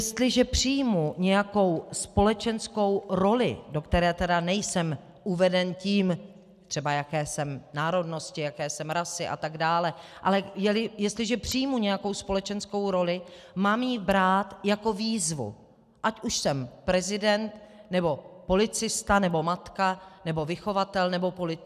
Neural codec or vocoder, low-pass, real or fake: none; 14.4 kHz; real